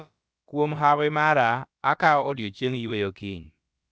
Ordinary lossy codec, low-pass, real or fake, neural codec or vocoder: none; none; fake; codec, 16 kHz, about 1 kbps, DyCAST, with the encoder's durations